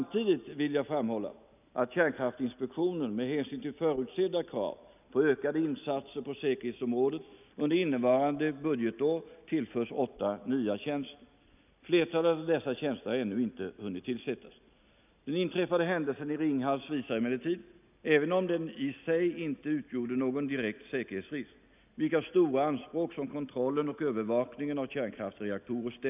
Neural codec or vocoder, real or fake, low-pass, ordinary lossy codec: none; real; 3.6 kHz; none